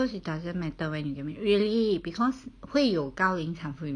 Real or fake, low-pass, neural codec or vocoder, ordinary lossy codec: real; 9.9 kHz; none; none